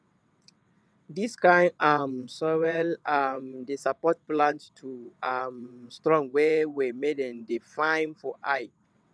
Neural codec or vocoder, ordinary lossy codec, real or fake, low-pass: vocoder, 22.05 kHz, 80 mel bands, WaveNeXt; none; fake; none